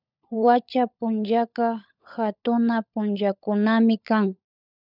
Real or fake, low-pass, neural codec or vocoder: fake; 5.4 kHz; codec, 16 kHz, 16 kbps, FunCodec, trained on LibriTTS, 50 frames a second